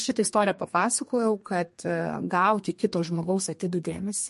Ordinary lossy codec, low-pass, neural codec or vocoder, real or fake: MP3, 48 kbps; 14.4 kHz; codec, 32 kHz, 1.9 kbps, SNAC; fake